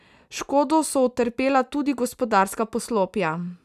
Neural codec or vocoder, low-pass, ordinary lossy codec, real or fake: none; 14.4 kHz; none; real